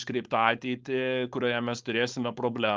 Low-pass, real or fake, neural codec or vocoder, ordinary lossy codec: 7.2 kHz; fake; codec, 16 kHz, 4.8 kbps, FACodec; Opus, 24 kbps